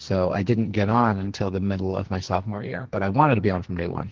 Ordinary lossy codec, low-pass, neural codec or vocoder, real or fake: Opus, 16 kbps; 7.2 kHz; codec, 44.1 kHz, 2.6 kbps, SNAC; fake